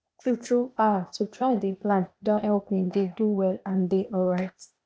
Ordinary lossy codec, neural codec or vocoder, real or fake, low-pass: none; codec, 16 kHz, 0.8 kbps, ZipCodec; fake; none